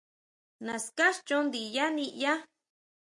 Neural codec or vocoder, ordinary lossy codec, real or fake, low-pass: none; MP3, 64 kbps; real; 10.8 kHz